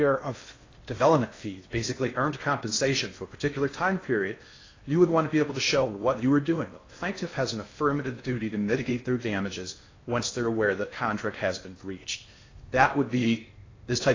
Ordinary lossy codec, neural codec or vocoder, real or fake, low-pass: AAC, 32 kbps; codec, 16 kHz in and 24 kHz out, 0.6 kbps, FocalCodec, streaming, 4096 codes; fake; 7.2 kHz